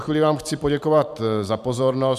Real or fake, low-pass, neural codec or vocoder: fake; 14.4 kHz; vocoder, 44.1 kHz, 128 mel bands every 512 samples, BigVGAN v2